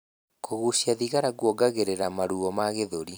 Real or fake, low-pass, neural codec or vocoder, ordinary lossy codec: fake; none; vocoder, 44.1 kHz, 128 mel bands every 256 samples, BigVGAN v2; none